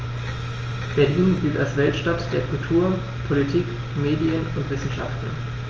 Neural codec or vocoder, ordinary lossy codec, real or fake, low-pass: none; Opus, 24 kbps; real; 7.2 kHz